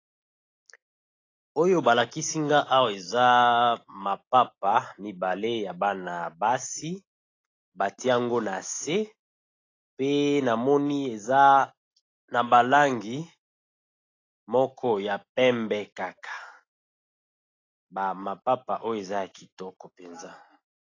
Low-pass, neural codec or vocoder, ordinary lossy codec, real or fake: 7.2 kHz; none; AAC, 32 kbps; real